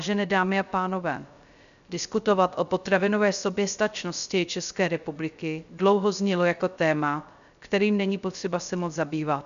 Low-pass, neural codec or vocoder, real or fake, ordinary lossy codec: 7.2 kHz; codec, 16 kHz, 0.3 kbps, FocalCodec; fake; MP3, 96 kbps